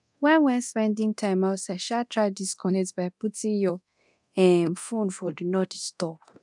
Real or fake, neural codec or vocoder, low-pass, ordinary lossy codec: fake; codec, 24 kHz, 0.9 kbps, DualCodec; 10.8 kHz; none